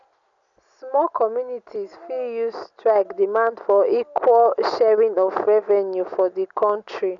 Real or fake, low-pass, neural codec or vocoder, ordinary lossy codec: real; 7.2 kHz; none; none